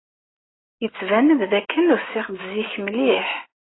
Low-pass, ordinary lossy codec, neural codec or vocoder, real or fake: 7.2 kHz; AAC, 16 kbps; none; real